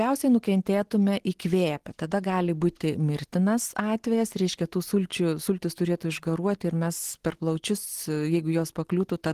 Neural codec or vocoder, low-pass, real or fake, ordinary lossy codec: none; 14.4 kHz; real; Opus, 16 kbps